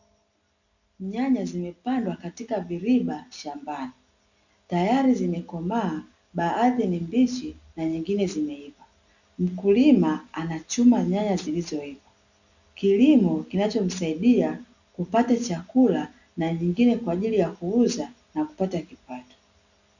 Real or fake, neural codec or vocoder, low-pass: real; none; 7.2 kHz